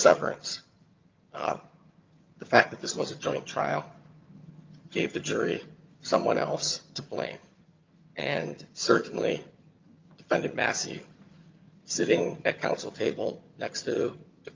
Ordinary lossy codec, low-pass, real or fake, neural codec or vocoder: Opus, 24 kbps; 7.2 kHz; fake; vocoder, 22.05 kHz, 80 mel bands, HiFi-GAN